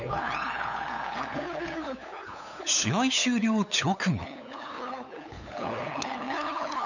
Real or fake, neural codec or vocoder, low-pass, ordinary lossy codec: fake; codec, 16 kHz, 8 kbps, FunCodec, trained on LibriTTS, 25 frames a second; 7.2 kHz; none